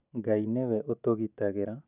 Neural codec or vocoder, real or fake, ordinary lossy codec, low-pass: none; real; none; 3.6 kHz